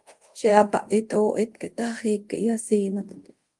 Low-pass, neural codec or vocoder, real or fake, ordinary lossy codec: 10.8 kHz; codec, 24 kHz, 0.5 kbps, DualCodec; fake; Opus, 24 kbps